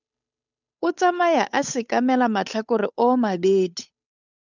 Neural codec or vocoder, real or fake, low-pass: codec, 16 kHz, 8 kbps, FunCodec, trained on Chinese and English, 25 frames a second; fake; 7.2 kHz